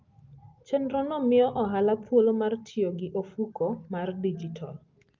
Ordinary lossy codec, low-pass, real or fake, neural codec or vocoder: Opus, 32 kbps; 7.2 kHz; real; none